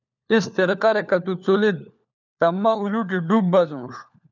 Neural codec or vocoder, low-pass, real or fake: codec, 16 kHz, 4 kbps, FunCodec, trained on LibriTTS, 50 frames a second; 7.2 kHz; fake